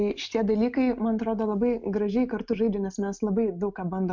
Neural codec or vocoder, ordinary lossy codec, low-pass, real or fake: none; MP3, 64 kbps; 7.2 kHz; real